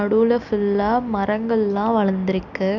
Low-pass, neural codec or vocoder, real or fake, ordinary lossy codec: 7.2 kHz; none; real; none